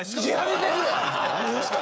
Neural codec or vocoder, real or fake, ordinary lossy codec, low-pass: codec, 16 kHz, 16 kbps, FreqCodec, smaller model; fake; none; none